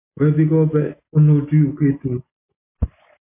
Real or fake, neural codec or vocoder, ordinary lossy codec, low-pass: real; none; MP3, 24 kbps; 3.6 kHz